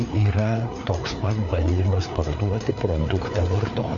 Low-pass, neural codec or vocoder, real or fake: 7.2 kHz; codec, 16 kHz, 4 kbps, FreqCodec, larger model; fake